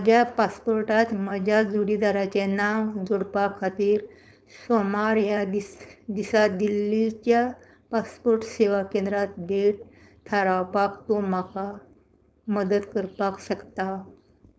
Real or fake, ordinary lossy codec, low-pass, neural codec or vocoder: fake; none; none; codec, 16 kHz, 4.8 kbps, FACodec